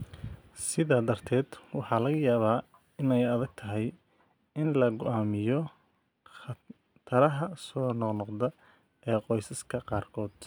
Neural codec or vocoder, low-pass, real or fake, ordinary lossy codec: none; none; real; none